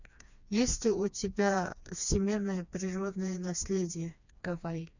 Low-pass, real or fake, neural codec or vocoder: 7.2 kHz; fake; codec, 16 kHz, 2 kbps, FreqCodec, smaller model